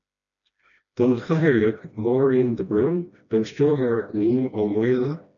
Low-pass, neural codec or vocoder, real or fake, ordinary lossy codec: 7.2 kHz; codec, 16 kHz, 1 kbps, FreqCodec, smaller model; fake; MP3, 96 kbps